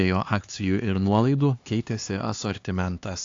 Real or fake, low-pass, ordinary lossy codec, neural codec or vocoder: fake; 7.2 kHz; AAC, 48 kbps; codec, 16 kHz, 2 kbps, X-Codec, HuBERT features, trained on LibriSpeech